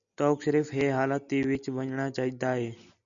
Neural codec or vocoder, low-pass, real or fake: none; 7.2 kHz; real